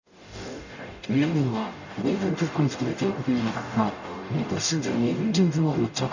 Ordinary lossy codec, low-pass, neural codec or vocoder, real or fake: none; 7.2 kHz; codec, 44.1 kHz, 0.9 kbps, DAC; fake